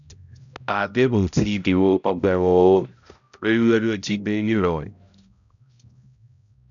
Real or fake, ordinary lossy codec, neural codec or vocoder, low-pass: fake; none; codec, 16 kHz, 0.5 kbps, X-Codec, HuBERT features, trained on balanced general audio; 7.2 kHz